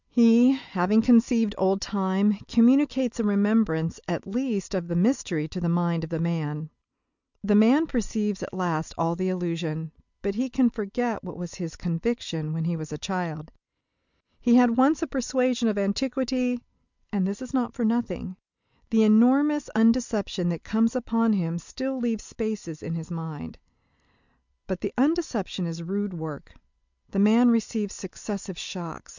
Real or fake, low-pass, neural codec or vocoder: real; 7.2 kHz; none